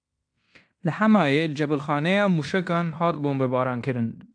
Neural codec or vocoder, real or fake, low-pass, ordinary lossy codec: codec, 16 kHz in and 24 kHz out, 0.9 kbps, LongCat-Audio-Codec, fine tuned four codebook decoder; fake; 9.9 kHz; AAC, 64 kbps